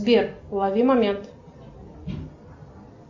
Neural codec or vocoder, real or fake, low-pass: none; real; 7.2 kHz